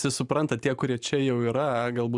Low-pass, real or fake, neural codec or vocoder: 10.8 kHz; fake; vocoder, 44.1 kHz, 128 mel bands every 512 samples, BigVGAN v2